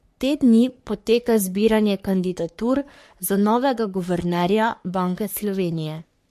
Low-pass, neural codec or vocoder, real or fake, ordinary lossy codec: 14.4 kHz; codec, 44.1 kHz, 3.4 kbps, Pupu-Codec; fake; MP3, 64 kbps